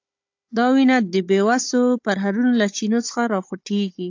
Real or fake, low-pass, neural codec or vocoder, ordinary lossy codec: fake; 7.2 kHz; codec, 16 kHz, 16 kbps, FunCodec, trained on Chinese and English, 50 frames a second; AAC, 48 kbps